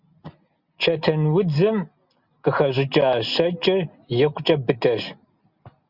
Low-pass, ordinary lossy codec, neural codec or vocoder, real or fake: 5.4 kHz; Opus, 64 kbps; none; real